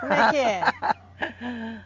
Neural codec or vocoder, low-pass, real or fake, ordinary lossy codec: none; 7.2 kHz; real; Opus, 32 kbps